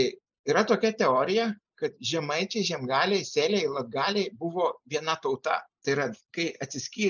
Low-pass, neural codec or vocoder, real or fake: 7.2 kHz; none; real